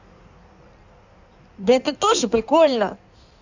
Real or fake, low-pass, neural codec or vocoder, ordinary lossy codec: fake; 7.2 kHz; codec, 16 kHz in and 24 kHz out, 1.1 kbps, FireRedTTS-2 codec; none